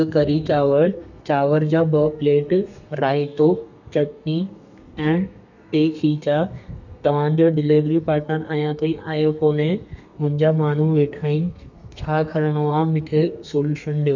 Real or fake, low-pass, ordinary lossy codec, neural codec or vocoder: fake; 7.2 kHz; none; codec, 32 kHz, 1.9 kbps, SNAC